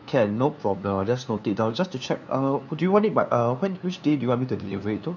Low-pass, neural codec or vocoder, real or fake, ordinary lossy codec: 7.2 kHz; codec, 16 kHz, 2 kbps, FunCodec, trained on LibriTTS, 25 frames a second; fake; none